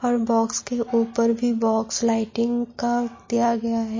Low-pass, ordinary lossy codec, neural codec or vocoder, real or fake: 7.2 kHz; MP3, 32 kbps; none; real